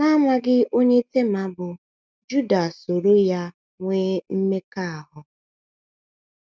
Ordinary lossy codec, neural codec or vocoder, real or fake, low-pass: none; none; real; none